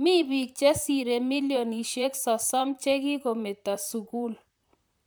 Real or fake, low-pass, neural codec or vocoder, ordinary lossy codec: fake; none; vocoder, 44.1 kHz, 128 mel bands, Pupu-Vocoder; none